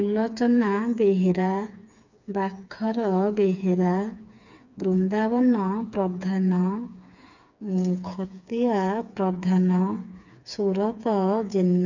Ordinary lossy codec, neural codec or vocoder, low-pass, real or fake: none; codec, 16 kHz, 4 kbps, FreqCodec, smaller model; 7.2 kHz; fake